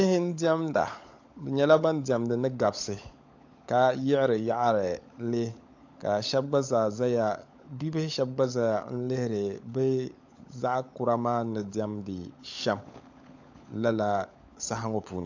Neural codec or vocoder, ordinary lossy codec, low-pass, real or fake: codec, 16 kHz, 16 kbps, FunCodec, trained on LibriTTS, 50 frames a second; AAC, 48 kbps; 7.2 kHz; fake